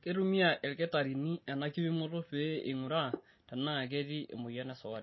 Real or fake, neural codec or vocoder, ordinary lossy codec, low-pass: real; none; MP3, 24 kbps; 7.2 kHz